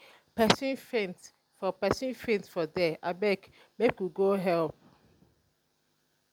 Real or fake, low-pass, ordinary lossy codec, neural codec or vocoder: real; 19.8 kHz; none; none